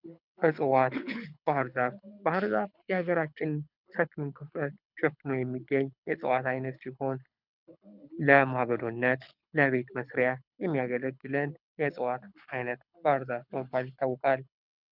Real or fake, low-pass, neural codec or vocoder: fake; 5.4 kHz; codec, 24 kHz, 6 kbps, HILCodec